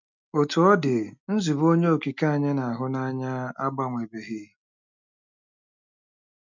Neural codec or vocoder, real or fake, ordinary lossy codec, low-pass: none; real; none; 7.2 kHz